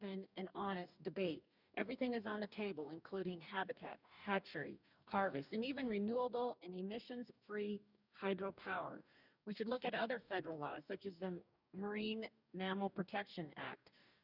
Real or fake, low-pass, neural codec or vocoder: fake; 5.4 kHz; codec, 44.1 kHz, 2.6 kbps, DAC